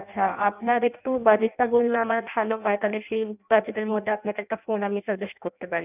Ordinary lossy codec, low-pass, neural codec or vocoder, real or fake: none; 3.6 kHz; codec, 16 kHz in and 24 kHz out, 0.6 kbps, FireRedTTS-2 codec; fake